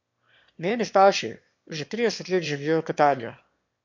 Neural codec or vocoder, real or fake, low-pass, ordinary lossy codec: autoencoder, 22.05 kHz, a latent of 192 numbers a frame, VITS, trained on one speaker; fake; 7.2 kHz; MP3, 48 kbps